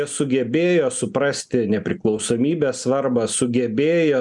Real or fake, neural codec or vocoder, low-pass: real; none; 10.8 kHz